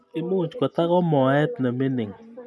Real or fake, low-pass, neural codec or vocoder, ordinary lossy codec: real; none; none; none